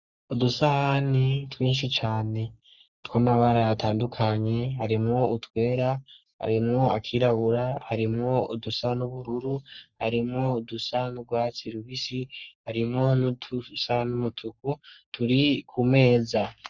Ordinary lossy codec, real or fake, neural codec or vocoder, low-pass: Opus, 64 kbps; fake; codec, 44.1 kHz, 3.4 kbps, Pupu-Codec; 7.2 kHz